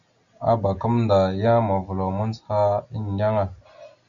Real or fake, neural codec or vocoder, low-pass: real; none; 7.2 kHz